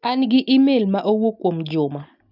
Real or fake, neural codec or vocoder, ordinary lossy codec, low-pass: real; none; none; 5.4 kHz